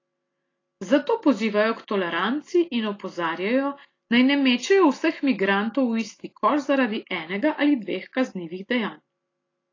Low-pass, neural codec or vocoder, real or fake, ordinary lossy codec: 7.2 kHz; none; real; AAC, 32 kbps